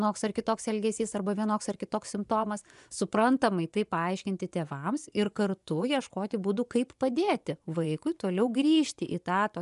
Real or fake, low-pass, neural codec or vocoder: fake; 10.8 kHz; vocoder, 24 kHz, 100 mel bands, Vocos